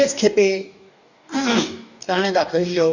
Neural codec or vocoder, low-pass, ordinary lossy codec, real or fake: codec, 44.1 kHz, 2.6 kbps, DAC; 7.2 kHz; none; fake